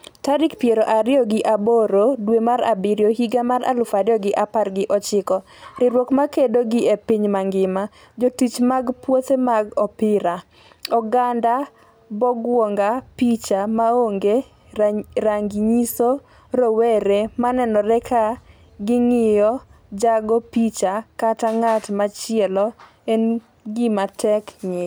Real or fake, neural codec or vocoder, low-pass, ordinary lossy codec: real; none; none; none